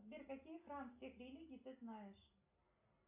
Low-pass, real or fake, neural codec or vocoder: 3.6 kHz; real; none